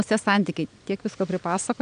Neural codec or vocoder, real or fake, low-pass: none; real; 9.9 kHz